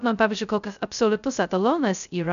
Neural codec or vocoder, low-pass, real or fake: codec, 16 kHz, 0.2 kbps, FocalCodec; 7.2 kHz; fake